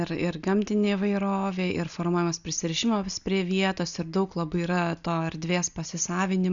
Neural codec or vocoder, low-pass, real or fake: none; 7.2 kHz; real